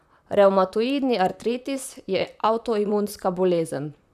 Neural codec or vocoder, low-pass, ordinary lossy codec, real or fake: vocoder, 44.1 kHz, 128 mel bands, Pupu-Vocoder; 14.4 kHz; none; fake